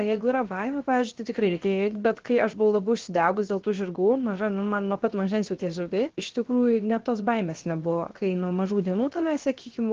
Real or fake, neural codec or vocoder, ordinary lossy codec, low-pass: fake; codec, 16 kHz, 0.7 kbps, FocalCodec; Opus, 16 kbps; 7.2 kHz